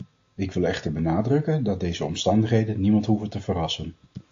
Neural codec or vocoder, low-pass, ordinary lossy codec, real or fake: none; 7.2 kHz; AAC, 48 kbps; real